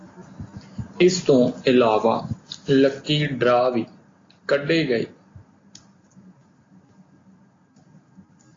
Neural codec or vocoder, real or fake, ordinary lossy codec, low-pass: none; real; AAC, 32 kbps; 7.2 kHz